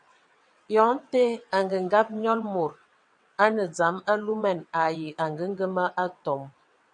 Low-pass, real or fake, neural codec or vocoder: 9.9 kHz; fake; vocoder, 22.05 kHz, 80 mel bands, WaveNeXt